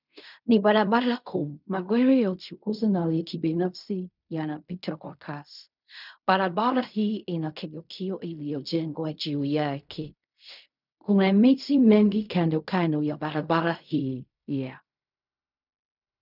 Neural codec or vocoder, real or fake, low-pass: codec, 16 kHz in and 24 kHz out, 0.4 kbps, LongCat-Audio-Codec, fine tuned four codebook decoder; fake; 5.4 kHz